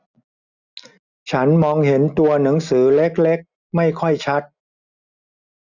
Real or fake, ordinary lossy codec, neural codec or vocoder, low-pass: real; none; none; 7.2 kHz